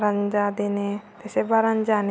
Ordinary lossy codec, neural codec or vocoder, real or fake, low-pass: none; none; real; none